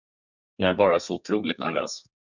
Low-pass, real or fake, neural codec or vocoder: 7.2 kHz; fake; codec, 32 kHz, 1.9 kbps, SNAC